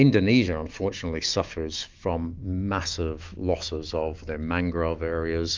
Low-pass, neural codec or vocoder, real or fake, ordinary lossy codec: 7.2 kHz; autoencoder, 48 kHz, 128 numbers a frame, DAC-VAE, trained on Japanese speech; fake; Opus, 24 kbps